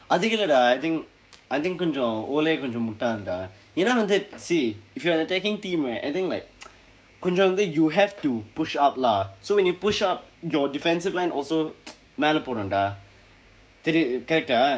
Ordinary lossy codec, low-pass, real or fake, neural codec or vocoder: none; none; fake; codec, 16 kHz, 6 kbps, DAC